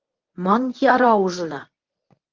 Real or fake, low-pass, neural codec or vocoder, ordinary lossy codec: fake; 7.2 kHz; codec, 24 kHz, 0.9 kbps, WavTokenizer, medium speech release version 1; Opus, 32 kbps